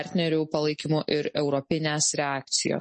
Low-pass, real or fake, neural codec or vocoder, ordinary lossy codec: 10.8 kHz; real; none; MP3, 32 kbps